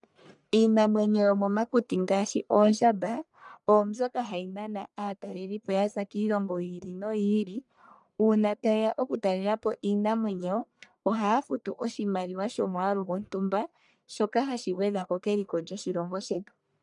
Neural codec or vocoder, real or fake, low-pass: codec, 44.1 kHz, 1.7 kbps, Pupu-Codec; fake; 10.8 kHz